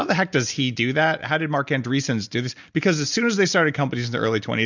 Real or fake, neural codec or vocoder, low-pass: real; none; 7.2 kHz